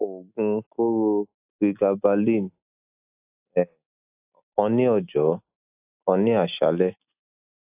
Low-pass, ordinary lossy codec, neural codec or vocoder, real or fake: 3.6 kHz; AAC, 32 kbps; none; real